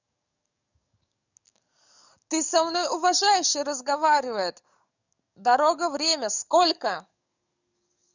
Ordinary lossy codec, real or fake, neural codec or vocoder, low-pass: none; fake; codec, 44.1 kHz, 7.8 kbps, DAC; 7.2 kHz